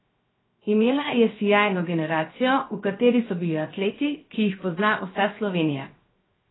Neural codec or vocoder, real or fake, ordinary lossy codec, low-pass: codec, 16 kHz, 0.7 kbps, FocalCodec; fake; AAC, 16 kbps; 7.2 kHz